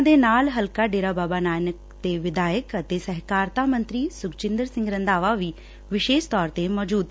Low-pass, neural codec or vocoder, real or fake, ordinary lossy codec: none; none; real; none